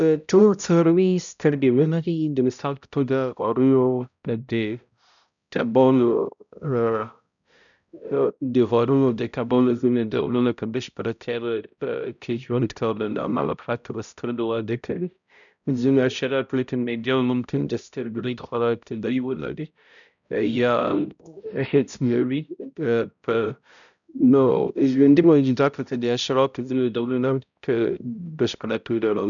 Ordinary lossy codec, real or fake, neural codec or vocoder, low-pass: none; fake; codec, 16 kHz, 0.5 kbps, X-Codec, HuBERT features, trained on balanced general audio; 7.2 kHz